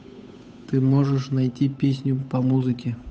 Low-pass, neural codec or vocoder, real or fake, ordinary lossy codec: none; codec, 16 kHz, 8 kbps, FunCodec, trained on Chinese and English, 25 frames a second; fake; none